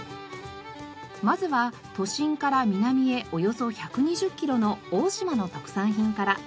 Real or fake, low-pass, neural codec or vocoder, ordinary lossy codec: real; none; none; none